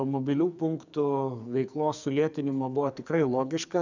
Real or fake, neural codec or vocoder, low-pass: fake; codec, 44.1 kHz, 2.6 kbps, SNAC; 7.2 kHz